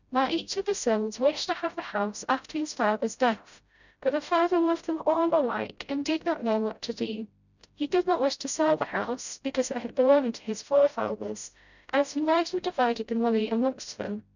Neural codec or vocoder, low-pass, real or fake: codec, 16 kHz, 0.5 kbps, FreqCodec, smaller model; 7.2 kHz; fake